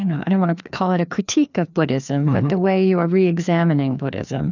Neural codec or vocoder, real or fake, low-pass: codec, 16 kHz, 2 kbps, FreqCodec, larger model; fake; 7.2 kHz